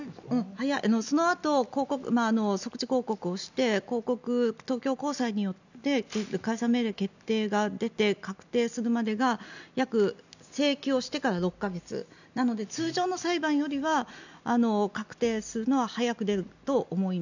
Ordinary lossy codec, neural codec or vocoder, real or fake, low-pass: none; none; real; 7.2 kHz